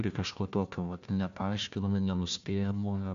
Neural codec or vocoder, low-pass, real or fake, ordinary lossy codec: codec, 16 kHz, 1 kbps, FunCodec, trained on Chinese and English, 50 frames a second; 7.2 kHz; fake; AAC, 64 kbps